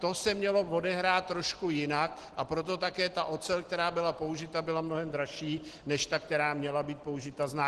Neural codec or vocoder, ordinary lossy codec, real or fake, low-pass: none; Opus, 16 kbps; real; 10.8 kHz